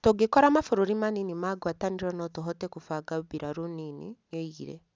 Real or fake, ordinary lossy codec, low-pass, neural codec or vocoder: real; none; none; none